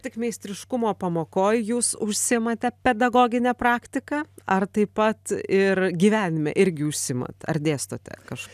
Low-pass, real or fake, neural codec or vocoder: 14.4 kHz; real; none